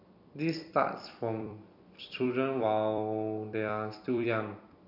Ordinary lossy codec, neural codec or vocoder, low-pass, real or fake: none; vocoder, 44.1 kHz, 128 mel bands every 256 samples, BigVGAN v2; 5.4 kHz; fake